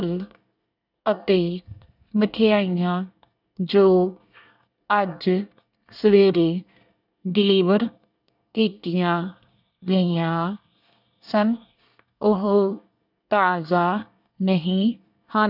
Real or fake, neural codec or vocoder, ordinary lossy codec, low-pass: fake; codec, 24 kHz, 1 kbps, SNAC; none; 5.4 kHz